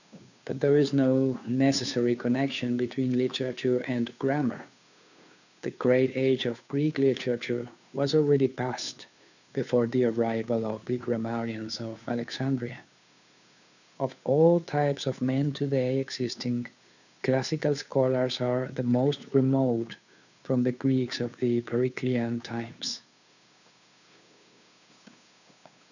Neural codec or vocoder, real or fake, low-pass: codec, 16 kHz, 2 kbps, FunCodec, trained on Chinese and English, 25 frames a second; fake; 7.2 kHz